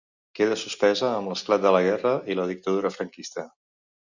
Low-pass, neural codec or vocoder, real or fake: 7.2 kHz; none; real